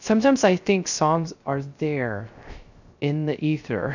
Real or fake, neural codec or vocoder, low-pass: fake; codec, 16 kHz, 0.3 kbps, FocalCodec; 7.2 kHz